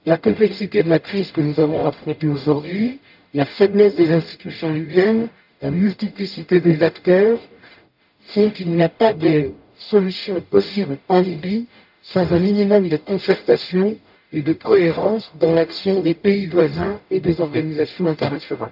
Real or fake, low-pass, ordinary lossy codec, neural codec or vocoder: fake; 5.4 kHz; AAC, 48 kbps; codec, 44.1 kHz, 0.9 kbps, DAC